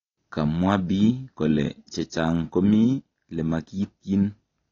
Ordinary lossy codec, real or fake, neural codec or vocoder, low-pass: AAC, 32 kbps; real; none; 7.2 kHz